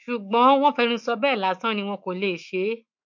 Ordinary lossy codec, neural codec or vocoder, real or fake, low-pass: MP3, 48 kbps; autoencoder, 48 kHz, 128 numbers a frame, DAC-VAE, trained on Japanese speech; fake; 7.2 kHz